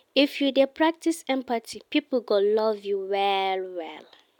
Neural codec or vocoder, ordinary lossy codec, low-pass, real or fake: none; none; 19.8 kHz; real